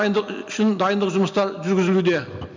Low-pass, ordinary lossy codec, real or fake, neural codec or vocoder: 7.2 kHz; MP3, 64 kbps; real; none